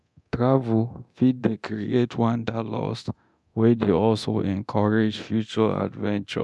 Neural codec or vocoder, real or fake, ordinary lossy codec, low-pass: codec, 24 kHz, 0.9 kbps, DualCodec; fake; none; none